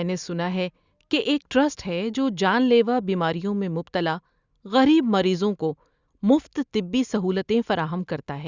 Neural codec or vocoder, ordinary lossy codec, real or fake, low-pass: none; Opus, 64 kbps; real; 7.2 kHz